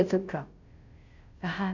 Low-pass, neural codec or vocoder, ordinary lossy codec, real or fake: 7.2 kHz; codec, 16 kHz, 0.5 kbps, FunCodec, trained on Chinese and English, 25 frames a second; none; fake